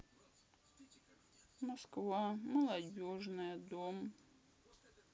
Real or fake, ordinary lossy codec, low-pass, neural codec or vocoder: real; none; none; none